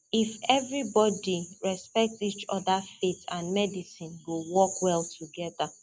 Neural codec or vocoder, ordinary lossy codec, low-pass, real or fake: none; none; none; real